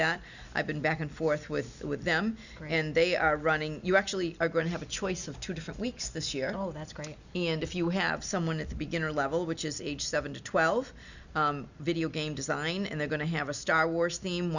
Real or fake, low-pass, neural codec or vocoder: real; 7.2 kHz; none